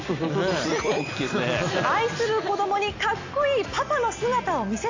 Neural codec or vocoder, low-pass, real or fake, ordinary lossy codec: none; 7.2 kHz; real; AAC, 48 kbps